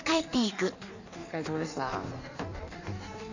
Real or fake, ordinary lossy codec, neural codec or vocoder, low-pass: fake; none; codec, 16 kHz in and 24 kHz out, 1.1 kbps, FireRedTTS-2 codec; 7.2 kHz